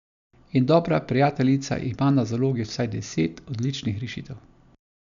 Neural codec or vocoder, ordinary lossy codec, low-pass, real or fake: none; none; 7.2 kHz; real